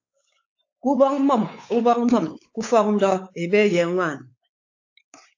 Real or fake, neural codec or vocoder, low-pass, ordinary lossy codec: fake; codec, 16 kHz, 4 kbps, X-Codec, WavLM features, trained on Multilingual LibriSpeech; 7.2 kHz; AAC, 48 kbps